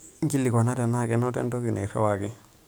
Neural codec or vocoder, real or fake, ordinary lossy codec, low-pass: codec, 44.1 kHz, 7.8 kbps, DAC; fake; none; none